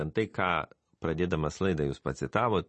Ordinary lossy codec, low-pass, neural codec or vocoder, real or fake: MP3, 32 kbps; 10.8 kHz; none; real